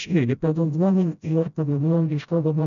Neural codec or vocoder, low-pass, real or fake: codec, 16 kHz, 0.5 kbps, FreqCodec, smaller model; 7.2 kHz; fake